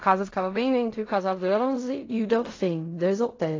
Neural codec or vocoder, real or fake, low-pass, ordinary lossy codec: codec, 16 kHz in and 24 kHz out, 0.4 kbps, LongCat-Audio-Codec, fine tuned four codebook decoder; fake; 7.2 kHz; AAC, 32 kbps